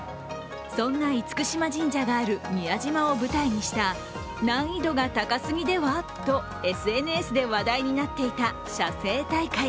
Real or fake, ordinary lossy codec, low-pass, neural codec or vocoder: real; none; none; none